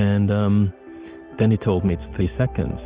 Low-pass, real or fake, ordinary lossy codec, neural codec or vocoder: 3.6 kHz; real; Opus, 32 kbps; none